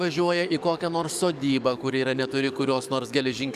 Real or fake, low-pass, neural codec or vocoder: fake; 14.4 kHz; codec, 44.1 kHz, 7.8 kbps, DAC